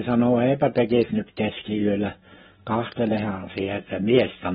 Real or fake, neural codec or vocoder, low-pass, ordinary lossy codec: fake; autoencoder, 48 kHz, 128 numbers a frame, DAC-VAE, trained on Japanese speech; 19.8 kHz; AAC, 16 kbps